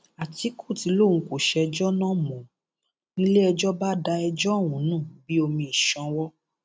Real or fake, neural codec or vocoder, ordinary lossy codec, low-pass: real; none; none; none